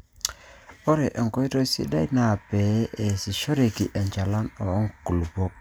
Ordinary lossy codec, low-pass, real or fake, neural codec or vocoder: none; none; real; none